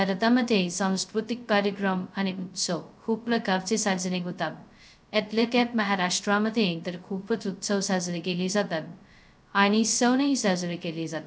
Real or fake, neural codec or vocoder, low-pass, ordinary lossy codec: fake; codec, 16 kHz, 0.2 kbps, FocalCodec; none; none